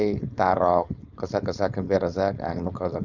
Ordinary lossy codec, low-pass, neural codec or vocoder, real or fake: none; 7.2 kHz; codec, 16 kHz, 4.8 kbps, FACodec; fake